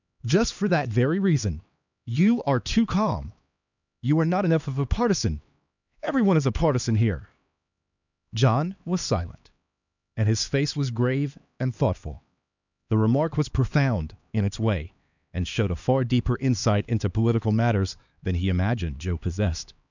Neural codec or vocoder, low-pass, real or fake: codec, 16 kHz, 2 kbps, X-Codec, HuBERT features, trained on LibriSpeech; 7.2 kHz; fake